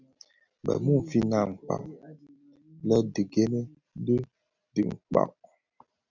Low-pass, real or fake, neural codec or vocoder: 7.2 kHz; real; none